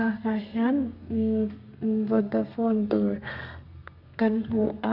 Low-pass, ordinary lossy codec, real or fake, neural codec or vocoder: 5.4 kHz; none; fake; codec, 32 kHz, 1.9 kbps, SNAC